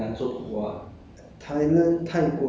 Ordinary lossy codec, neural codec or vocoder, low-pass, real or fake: none; none; none; real